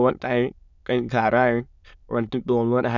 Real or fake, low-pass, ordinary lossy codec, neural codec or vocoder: fake; 7.2 kHz; none; autoencoder, 22.05 kHz, a latent of 192 numbers a frame, VITS, trained on many speakers